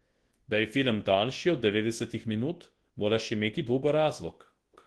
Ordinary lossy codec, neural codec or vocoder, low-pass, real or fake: Opus, 16 kbps; codec, 24 kHz, 0.9 kbps, WavTokenizer, large speech release; 10.8 kHz; fake